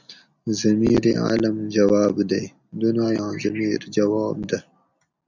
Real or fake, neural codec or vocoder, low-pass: real; none; 7.2 kHz